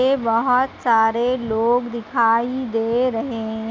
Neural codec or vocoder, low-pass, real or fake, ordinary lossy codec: none; none; real; none